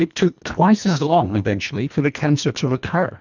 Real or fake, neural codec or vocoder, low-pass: fake; codec, 24 kHz, 1.5 kbps, HILCodec; 7.2 kHz